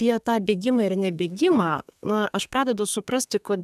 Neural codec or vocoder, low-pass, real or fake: codec, 32 kHz, 1.9 kbps, SNAC; 14.4 kHz; fake